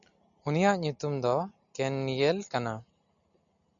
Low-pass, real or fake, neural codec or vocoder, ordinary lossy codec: 7.2 kHz; real; none; MP3, 64 kbps